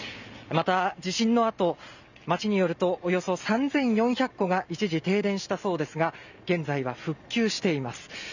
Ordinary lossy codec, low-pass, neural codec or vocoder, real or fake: none; 7.2 kHz; none; real